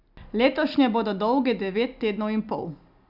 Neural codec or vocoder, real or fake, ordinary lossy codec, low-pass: none; real; none; 5.4 kHz